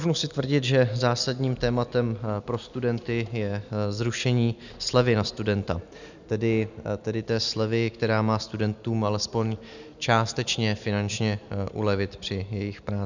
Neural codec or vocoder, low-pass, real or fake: none; 7.2 kHz; real